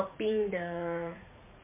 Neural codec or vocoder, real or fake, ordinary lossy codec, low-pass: vocoder, 44.1 kHz, 80 mel bands, Vocos; fake; MP3, 32 kbps; 3.6 kHz